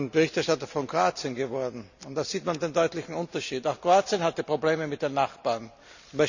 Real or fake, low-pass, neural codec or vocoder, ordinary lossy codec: real; 7.2 kHz; none; none